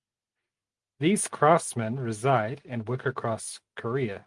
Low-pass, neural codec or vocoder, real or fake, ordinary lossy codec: 10.8 kHz; none; real; Opus, 32 kbps